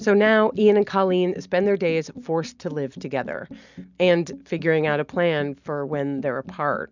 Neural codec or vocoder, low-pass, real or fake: none; 7.2 kHz; real